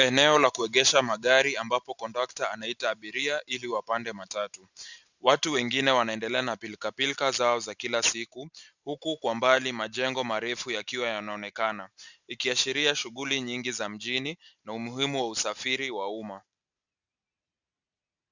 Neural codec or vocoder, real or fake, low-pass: none; real; 7.2 kHz